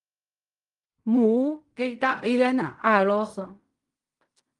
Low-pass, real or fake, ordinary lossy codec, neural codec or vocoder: 10.8 kHz; fake; Opus, 32 kbps; codec, 16 kHz in and 24 kHz out, 0.4 kbps, LongCat-Audio-Codec, fine tuned four codebook decoder